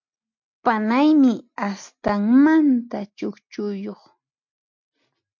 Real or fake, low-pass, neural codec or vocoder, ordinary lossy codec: real; 7.2 kHz; none; MP3, 48 kbps